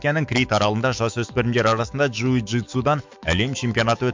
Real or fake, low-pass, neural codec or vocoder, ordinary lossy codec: fake; 7.2 kHz; autoencoder, 48 kHz, 128 numbers a frame, DAC-VAE, trained on Japanese speech; MP3, 64 kbps